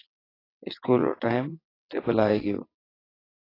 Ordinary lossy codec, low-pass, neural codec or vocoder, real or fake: AAC, 24 kbps; 5.4 kHz; vocoder, 22.05 kHz, 80 mel bands, WaveNeXt; fake